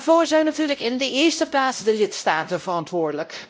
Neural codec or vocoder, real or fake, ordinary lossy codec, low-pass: codec, 16 kHz, 0.5 kbps, X-Codec, WavLM features, trained on Multilingual LibriSpeech; fake; none; none